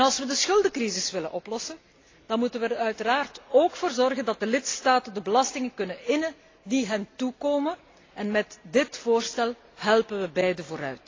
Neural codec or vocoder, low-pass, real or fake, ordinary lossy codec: none; 7.2 kHz; real; AAC, 32 kbps